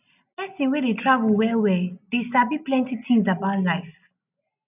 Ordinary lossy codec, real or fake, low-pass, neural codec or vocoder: none; real; 3.6 kHz; none